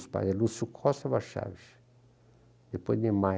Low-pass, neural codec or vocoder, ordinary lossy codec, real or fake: none; none; none; real